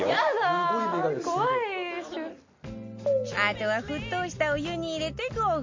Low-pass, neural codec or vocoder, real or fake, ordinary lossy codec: 7.2 kHz; none; real; MP3, 48 kbps